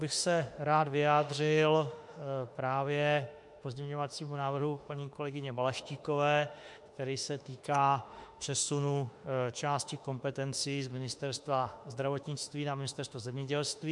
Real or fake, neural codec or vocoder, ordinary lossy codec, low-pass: fake; autoencoder, 48 kHz, 32 numbers a frame, DAC-VAE, trained on Japanese speech; MP3, 64 kbps; 10.8 kHz